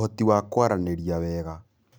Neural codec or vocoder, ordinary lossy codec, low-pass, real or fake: none; none; none; real